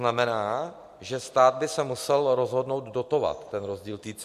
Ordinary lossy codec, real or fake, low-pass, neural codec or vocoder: MP3, 64 kbps; real; 14.4 kHz; none